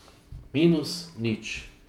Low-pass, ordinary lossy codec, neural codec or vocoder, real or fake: 19.8 kHz; MP3, 96 kbps; vocoder, 48 kHz, 128 mel bands, Vocos; fake